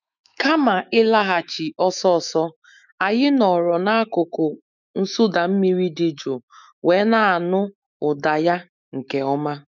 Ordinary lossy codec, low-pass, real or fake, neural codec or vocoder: none; 7.2 kHz; fake; autoencoder, 48 kHz, 128 numbers a frame, DAC-VAE, trained on Japanese speech